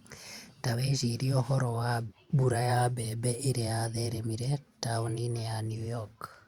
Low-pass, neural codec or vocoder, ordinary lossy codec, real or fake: 19.8 kHz; vocoder, 44.1 kHz, 128 mel bands every 512 samples, BigVGAN v2; Opus, 64 kbps; fake